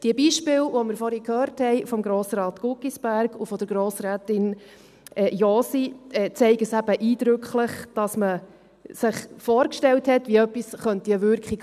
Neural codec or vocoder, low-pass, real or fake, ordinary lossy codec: none; 14.4 kHz; real; none